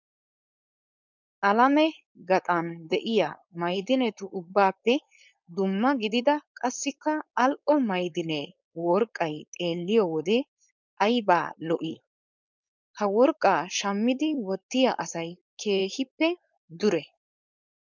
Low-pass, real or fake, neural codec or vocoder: 7.2 kHz; fake; codec, 16 kHz, 4.8 kbps, FACodec